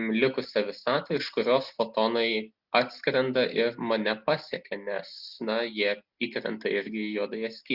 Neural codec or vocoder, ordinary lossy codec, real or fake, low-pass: none; Opus, 64 kbps; real; 5.4 kHz